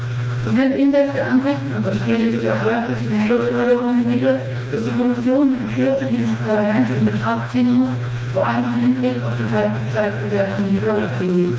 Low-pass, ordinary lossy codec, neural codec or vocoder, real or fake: none; none; codec, 16 kHz, 1 kbps, FreqCodec, smaller model; fake